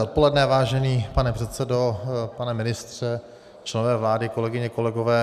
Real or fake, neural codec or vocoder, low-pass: real; none; 14.4 kHz